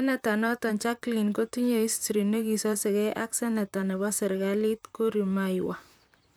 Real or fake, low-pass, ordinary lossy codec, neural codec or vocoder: fake; none; none; vocoder, 44.1 kHz, 128 mel bands, Pupu-Vocoder